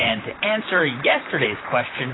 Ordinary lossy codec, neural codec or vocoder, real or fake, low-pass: AAC, 16 kbps; vocoder, 44.1 kHz, 128 mel bands, Pupu-Vocoder; fake; 7.2 kHz